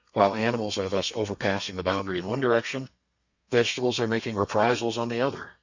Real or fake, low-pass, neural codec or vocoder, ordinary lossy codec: fake; 7.2 kHz; codec, 32 kHz, 1.9 kbps, SNAC; Opus, 64 kbps